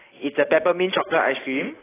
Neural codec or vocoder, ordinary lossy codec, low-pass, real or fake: none; AAC, 16 kbps; 3.6 kHz; real